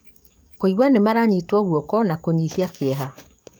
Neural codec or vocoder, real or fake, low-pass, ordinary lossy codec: codec, 44.1 kHz, 7.8 kbps, DAC; fake; none; none